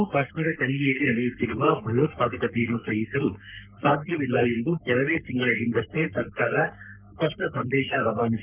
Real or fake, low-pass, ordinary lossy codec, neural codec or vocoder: fake; 3.6 kHz; Opus, 64 kbps; codec, 44.1 kHz, 3.4 kbps, Pupu-Codec